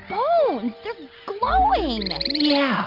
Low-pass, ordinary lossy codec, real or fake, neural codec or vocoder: 5.4 kHz; Opus, 24 kbps; real; none